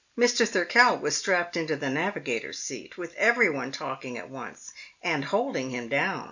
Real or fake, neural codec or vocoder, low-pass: real; none; 7.2 kHz